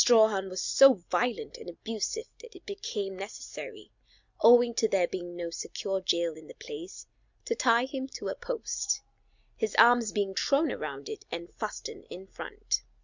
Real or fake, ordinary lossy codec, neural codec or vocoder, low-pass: real; Opus, 64 kbps; none; 7.2 kHz